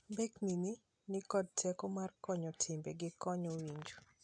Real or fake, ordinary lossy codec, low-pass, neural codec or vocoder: real; none; 9.9 kHz; none